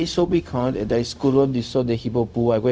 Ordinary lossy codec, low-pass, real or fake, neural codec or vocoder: none; none; fake; codec, 16 kHz, 0.4 kbps, LongCat-Audio-Codec